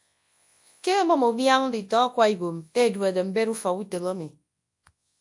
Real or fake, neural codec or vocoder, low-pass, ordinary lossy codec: fake; codec, 24 kHz, 0.9 kbps, WavTokenizer, large speech release; 10.8 kHz; AAC, 64 kbps